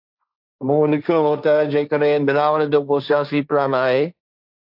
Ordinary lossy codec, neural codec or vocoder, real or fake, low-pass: AAC, 48 kbps; codec, 16 kHz, 1.1 kbps, Voila-Tokenizer; fake; 5.4 kHz